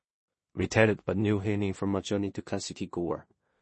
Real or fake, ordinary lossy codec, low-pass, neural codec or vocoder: fake; MP3, 32 kbps; 10.8 kHz; codec, 16 kHz in and 24 kHz out, 0.4 kbps, LongCat-Audio-Codec, two codebook decoder